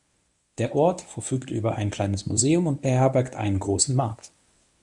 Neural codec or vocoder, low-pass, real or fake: codec, 24 kHz, 0.9 kbps, WavTokenizer, medium speech release version 1; 10.8 kHz; fake